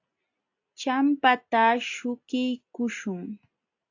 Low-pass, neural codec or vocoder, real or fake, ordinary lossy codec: 7.2 kHz; none; real; AAC, 48 kbps